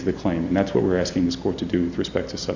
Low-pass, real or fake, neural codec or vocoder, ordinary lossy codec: 7.2 kHz; real; none; Opus, 64 kbps